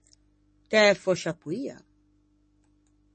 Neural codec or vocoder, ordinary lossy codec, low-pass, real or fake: codec, 44.1 kHz, 7.8 kbps, DAC; MP3, 32 kbps; 10.8 kHz; fake